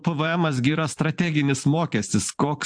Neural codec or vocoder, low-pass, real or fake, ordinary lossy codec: vocoder, 44.1 kHz, 128 mel bands every 512 samples, BigVGAN v2; 9.9 kHz; fake; AAC, 64 kbps